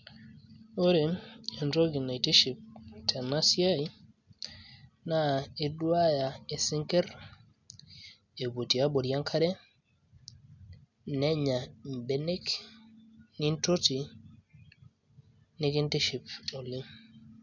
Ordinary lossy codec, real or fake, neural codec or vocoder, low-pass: none; real; none; 7.2 kHz